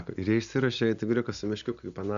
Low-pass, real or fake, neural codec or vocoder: 7.2 kHz; real; none